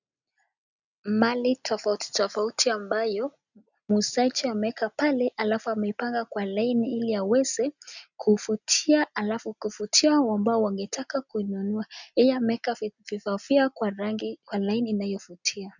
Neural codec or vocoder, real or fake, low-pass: none; real; 7.2 kHz